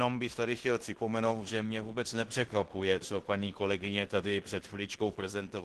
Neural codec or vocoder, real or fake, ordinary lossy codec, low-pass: codec, 16 kHz in and 24 kHz out, 0.9 kbps, LongCat-Audio-Codec, fine tuned four codebook decoder; fake; Opus, 16 kbps; 10.8 kHz